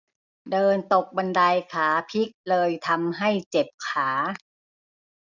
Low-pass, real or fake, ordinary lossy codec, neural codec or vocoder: 7.2 kHz; real; none; none